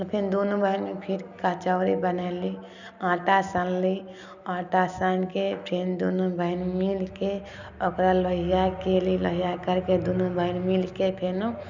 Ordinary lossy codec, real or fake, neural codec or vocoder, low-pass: none; real; none; 7.2 kHz